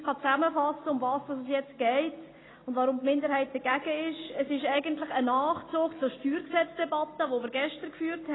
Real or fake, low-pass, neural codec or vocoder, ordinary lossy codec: real; 7.2 kHz; none; AAC, 16 kbps